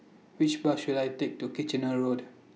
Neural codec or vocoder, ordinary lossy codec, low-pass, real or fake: none; none; none; real